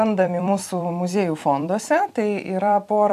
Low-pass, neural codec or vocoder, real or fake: 14.4 kHz; vocoder, 44.1 kHz, 128 mel bands every 256 samples, BigVGAN v2; fake